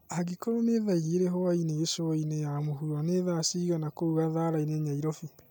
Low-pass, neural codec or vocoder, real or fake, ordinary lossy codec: none; none; real; none